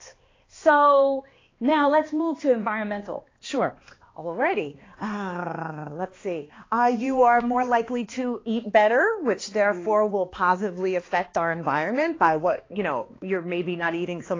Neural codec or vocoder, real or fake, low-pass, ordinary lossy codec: codec, 16 kHz, 2 kbps, X-Codec, HuBERT features, trained on balanced general audio; fake; 7.2 kHz; AAC, 32 kbps